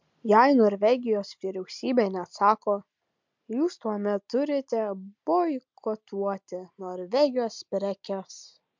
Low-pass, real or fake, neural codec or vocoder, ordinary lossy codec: 7.2 kHz; real; none; MP3, 64 kbps